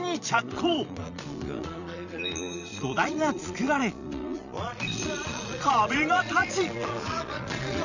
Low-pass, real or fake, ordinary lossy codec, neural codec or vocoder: 7.2 kHz; fake; none; vocoder, 22.05 kHz, 80 mel bands, Vocos